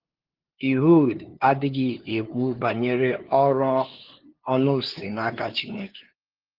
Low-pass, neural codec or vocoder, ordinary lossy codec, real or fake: 5.4 kHz; codec, 16 kHz, 2 kbps, FunCodec, trained on LibriTTS, 25 frames a second; Opus, 16 kbps; fake